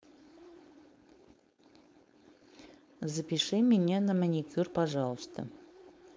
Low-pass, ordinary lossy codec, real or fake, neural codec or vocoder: none; none; fake; codec, 16 kHz, 4.8 kbps, FACodec